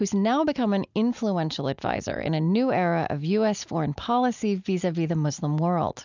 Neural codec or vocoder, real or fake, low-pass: none; real; 7.2 kHz